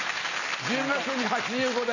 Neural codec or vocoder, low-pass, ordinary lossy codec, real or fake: none; 7.2 kHz; none; real